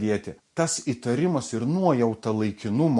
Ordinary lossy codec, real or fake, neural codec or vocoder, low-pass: MP3, 48 kbps; real; none; 10.8 kHz